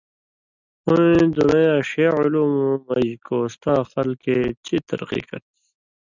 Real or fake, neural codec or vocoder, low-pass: real; none; 7.2 kHz